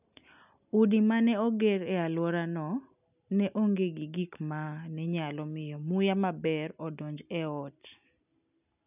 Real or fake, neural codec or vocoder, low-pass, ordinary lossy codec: real; none; 3.6 kHz; none